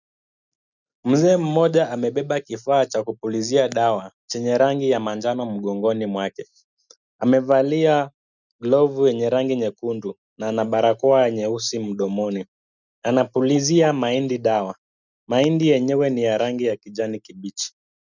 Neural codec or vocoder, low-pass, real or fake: none; 7.2 kHz; real